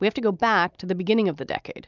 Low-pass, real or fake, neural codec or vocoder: 7.2 kHz; real; none